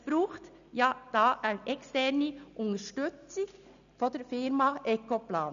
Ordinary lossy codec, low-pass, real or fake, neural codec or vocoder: none; 7.2 kHz; real; none